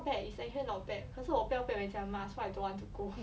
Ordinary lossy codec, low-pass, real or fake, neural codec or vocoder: none; none; real; none